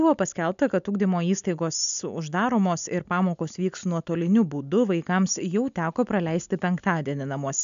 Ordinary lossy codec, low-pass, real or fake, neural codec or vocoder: AAC, 96 kbps; 7.2 kHz; real; none